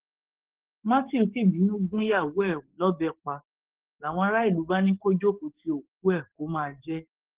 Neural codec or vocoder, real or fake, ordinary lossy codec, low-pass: codec, 44.1 kHz, 7.8 kbps, DAC; fake; Opus, 16 kbps; 3.6 kHz